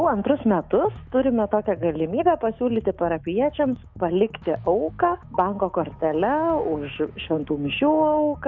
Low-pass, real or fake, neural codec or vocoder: 7.2 kHz; fake; autoencoder, 48 kHz, 128 numbers a frame, DAC-VAE, trained on Japanese speech